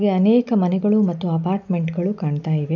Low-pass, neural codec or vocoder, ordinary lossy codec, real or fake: 7.2 kHz; none; none; real